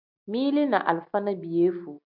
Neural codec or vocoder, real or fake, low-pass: none; real; 5.4 kHz